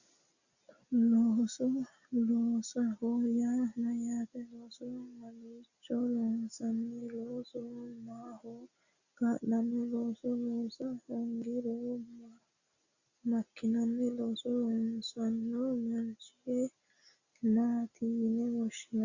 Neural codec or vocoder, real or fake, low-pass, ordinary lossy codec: none; real; 7.2 kHz; Opus, 64 kbps